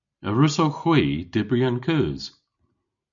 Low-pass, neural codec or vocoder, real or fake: 7.2 kHz; none; real